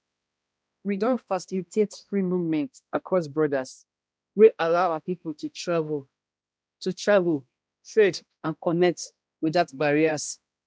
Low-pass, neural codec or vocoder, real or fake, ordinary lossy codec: none; codec, 16 kHz, 1 kbps, X-Codec, HuBERT features, trained on balanced general audio; fake; none